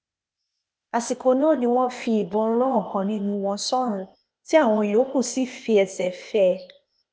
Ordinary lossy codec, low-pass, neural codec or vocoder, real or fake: none; none; codec, 16 kHz, 0.8 kbps, ZipCodec; fake